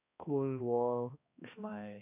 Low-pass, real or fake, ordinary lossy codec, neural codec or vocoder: 3.6 kHz; fake; none; codec, 16 kHz, 1 kbps, X-Codec, HuBERT features, trained on balanced general audio